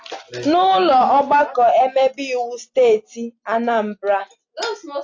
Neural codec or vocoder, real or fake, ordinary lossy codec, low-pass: none; real; AAC, 48 kbps; 7.2 kHz